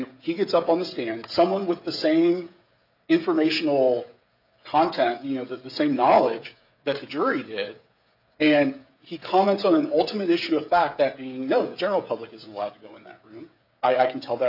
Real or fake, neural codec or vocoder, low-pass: fake; codec, 16 kHz, 16 kbps, FreqCodec, smaller model; 5.4 kHz